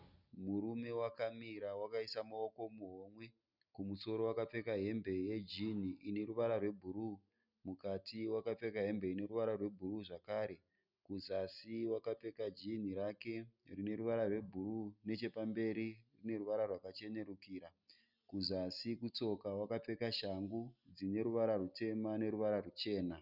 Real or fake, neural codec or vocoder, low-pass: real; none; 5.4 kHz